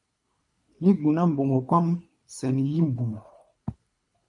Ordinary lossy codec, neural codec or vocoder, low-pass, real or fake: MP3, 48 kbps; codec, 24 kHz, 3 kbps, HILCodec; 10.8 kHz; fake